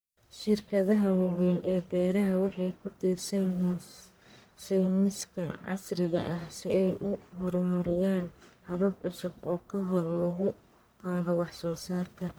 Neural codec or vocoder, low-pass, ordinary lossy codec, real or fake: codec, 44.1 kHz, 1.7 kbps, Pupu-Codec; none; none; fake